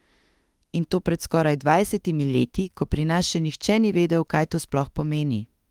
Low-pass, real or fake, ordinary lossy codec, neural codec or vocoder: 19.8 kHz; fake; Opus, 24 kbps; autoencoder, 48 kHz, 32 numbers a frame, DAC-VAE, trained on Japanese speech